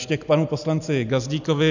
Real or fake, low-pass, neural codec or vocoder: real; 7.2 kHz; none